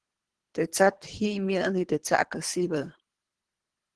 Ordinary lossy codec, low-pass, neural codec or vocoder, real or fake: Opus, 16 kbps; 10.8 kHz; codec, 24 kHz, 3 kbps, HILCodec; fake